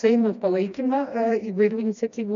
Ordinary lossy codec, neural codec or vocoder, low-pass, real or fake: MP3, 96 kbps; codec, 16 kHz, 1 kbps, FreqCodec, smaller model; 7.2 kHz; fake